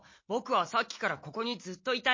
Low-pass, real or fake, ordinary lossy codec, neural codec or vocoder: 7.2 kHz; real; MP3, 32 kbps; none